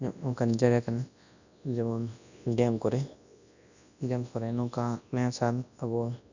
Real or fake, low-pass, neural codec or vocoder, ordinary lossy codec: fake; 7.2 kHz; codec, 24 kHz, 0.9 kbps, WavTokenizer, large speech release; none